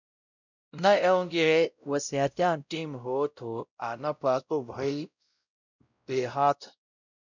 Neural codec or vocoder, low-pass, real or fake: codec, 16 kHz, 0.5 kbps, X-Codec, WavLM features, trained on Multilingual LibriSpeech; 7.2 kHz; fake